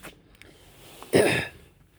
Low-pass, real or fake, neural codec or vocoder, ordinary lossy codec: none; fake; codec, 44.1 kHz, 7.8 kbps, Pupu-Codec; none